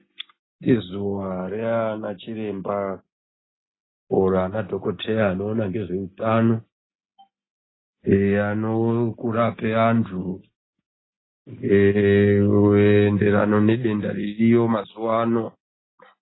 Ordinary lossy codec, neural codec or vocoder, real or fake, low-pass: AAC, 16 kbps; none; real; 7.2 kHz